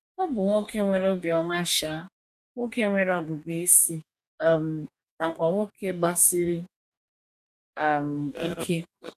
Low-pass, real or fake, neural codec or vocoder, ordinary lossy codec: 14.4 kHz; fake; codec, 44.1 kHz, 2.6 kbps, DAC; none